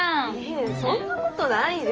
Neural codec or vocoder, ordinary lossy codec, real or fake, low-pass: none; Opus, 24 kbps; real; 7.2 kHz